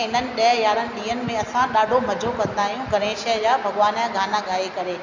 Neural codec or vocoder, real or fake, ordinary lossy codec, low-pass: none; real; none; 7.2 kHz